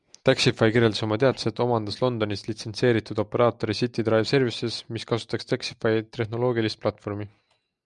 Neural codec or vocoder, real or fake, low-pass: none; real; 10.8 kHz